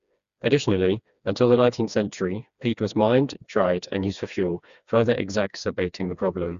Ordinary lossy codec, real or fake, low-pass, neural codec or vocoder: Opus, 64 kbps; fake; 7.2 kHz; codec, 16 kHz, 2 kbps, FreqCodec, smaller model